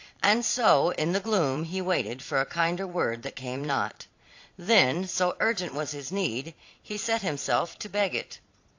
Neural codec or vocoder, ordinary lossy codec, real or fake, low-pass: vocoder, 22.05 kHz, 80 mel bands, Vocos; AAC, 48 kbps; fake; 7.2 kHz